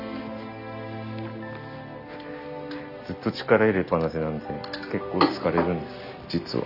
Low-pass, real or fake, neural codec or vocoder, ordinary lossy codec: 5.4 kHz; real; none; none